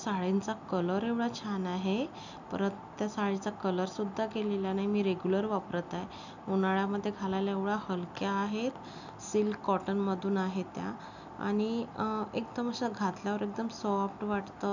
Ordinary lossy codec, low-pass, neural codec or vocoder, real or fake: none; 7.2 kHz; none; real